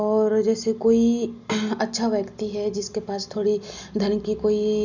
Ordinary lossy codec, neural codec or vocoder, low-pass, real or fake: none; none; 7.2 kHz; real